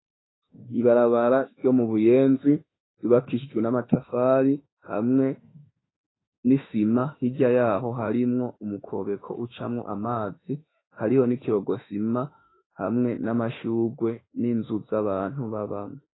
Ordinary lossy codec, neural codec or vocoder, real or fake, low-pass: AAC, 16 kbps; autoencoder, 48 kHz, 32 numbers a frame, DAC-VAE, trained on Japanese speech; fake; 7.2 kHz